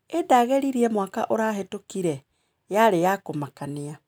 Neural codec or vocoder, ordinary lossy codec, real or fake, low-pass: none; none; real; none